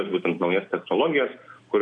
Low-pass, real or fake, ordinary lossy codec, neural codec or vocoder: 9.9 kHz; real; MP3, 96 kbps; none